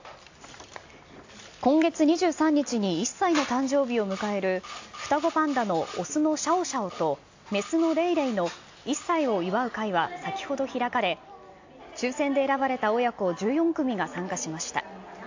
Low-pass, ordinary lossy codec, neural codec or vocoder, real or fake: 7.2 kHz; AAC, 48 kbps; none; real